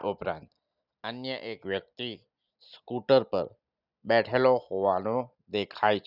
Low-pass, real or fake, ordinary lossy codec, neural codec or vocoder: 5.4 kHz; real; none; none